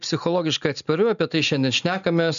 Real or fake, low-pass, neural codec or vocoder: real; 7.2 kHz; none